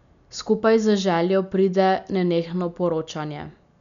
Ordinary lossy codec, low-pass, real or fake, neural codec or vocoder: none; 7.2 kHz; real; none